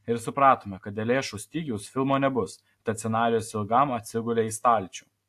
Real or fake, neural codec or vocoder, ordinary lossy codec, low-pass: real; none; AAC, 64 kbps; 14.4 kHz